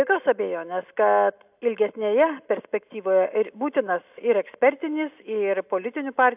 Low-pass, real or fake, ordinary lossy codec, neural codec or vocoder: 3.6 kHz; real; AAC, 32 kbps; none